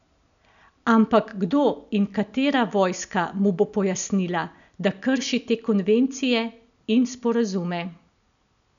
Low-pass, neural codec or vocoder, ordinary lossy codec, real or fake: 7.2 kHz; none; none; real